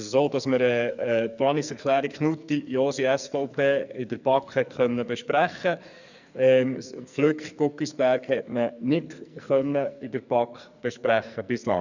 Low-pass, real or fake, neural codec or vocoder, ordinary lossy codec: 7.2 kHz; fake; codec, 44.1 kHz, 2.6 kbps, SNAC; none